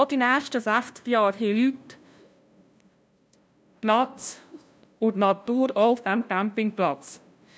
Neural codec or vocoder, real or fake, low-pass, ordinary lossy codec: codec, 16 kHz, 0.5 kbps, FunCodec, trained on LibriTTS, 25 frames a second; fake; none; none